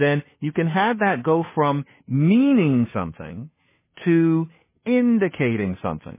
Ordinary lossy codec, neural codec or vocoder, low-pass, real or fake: MP3, 16 kbps; codec, 16 kHz, 2 kbps, FunCodec, trained on Chinese and English, 25 frames a second; 3.6 kHz; fake